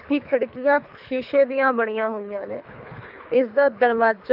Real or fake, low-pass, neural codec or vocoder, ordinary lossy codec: fake; 5.4 kHz; codec, 24 kHz, 3 kbps, HILCodec; AAC, 48 kbps